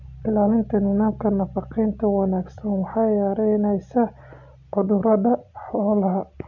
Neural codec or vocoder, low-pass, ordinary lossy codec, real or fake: none; 7.2 kHz; none; real